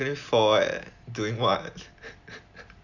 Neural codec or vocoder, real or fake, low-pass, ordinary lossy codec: none; real; 7.2 kHz; none